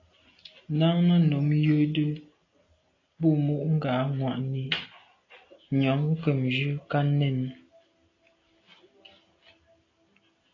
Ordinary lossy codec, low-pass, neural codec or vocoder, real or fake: AAC, 32 kbps; 7.2 kHz; none; real